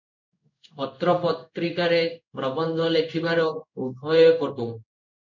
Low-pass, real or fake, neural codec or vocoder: 7.2 kHz; fake; codec, 16 kHz in and 24 kHz out, 1 kbps, XY-Tokenizer